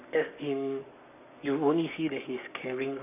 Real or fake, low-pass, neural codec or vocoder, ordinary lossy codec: fake; 3.6 kHz; codec, 44.1 kHz, 7.8 kbps, Pupu-Codec; none